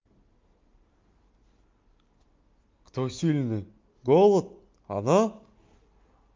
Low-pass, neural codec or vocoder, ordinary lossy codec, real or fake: 7.2 kHz; none; Opus, 32 kbps; real